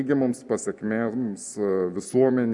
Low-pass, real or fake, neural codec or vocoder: 10.8 kHz; real; none